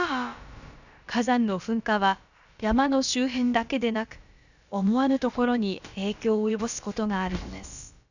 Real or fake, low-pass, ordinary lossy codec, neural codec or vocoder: fake; 7.2 kHz; none; codec, 16 kHz, about 1 kbps, DyCAST, with the encoder's durations